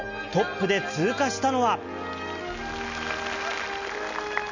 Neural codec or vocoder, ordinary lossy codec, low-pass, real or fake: none; none; 7.2 kHz; real